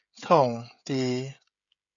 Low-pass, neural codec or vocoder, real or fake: 7.2 kHz; codec, 16 kHz, 16 kbps, FreqCodec, smaller model; fake